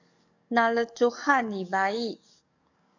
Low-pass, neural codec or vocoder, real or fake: 7.2 kHz; codec, 44.1 kHz, 7.8 kbps, DAC; fake